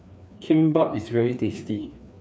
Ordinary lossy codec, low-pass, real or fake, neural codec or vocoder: none; none; fake; codec, 16 kHz, 2 kbps, FreqCodec, larger model